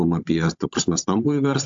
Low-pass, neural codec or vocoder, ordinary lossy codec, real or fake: 7.2 kHz; codec, 16 kHz, 16 kbps, FunCodec, trained on Chinese and English, 50 frames a second; AAC, 64 kbps; fake